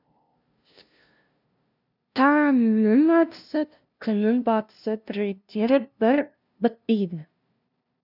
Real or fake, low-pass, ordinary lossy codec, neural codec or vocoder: fake; 5.4 kHz; none; codec, 16 kHz, 0.5 kbps, FunCodec, trained on LibriTTS, 25 frames a second